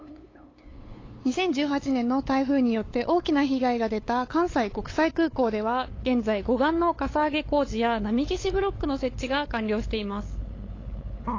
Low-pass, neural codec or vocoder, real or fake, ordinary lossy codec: 7.2 kHz; codec, 16 kHz, 8 kbps, FunCodec, trained on LibriTTS, 25 frames a second; fake; AAC, 32 kbps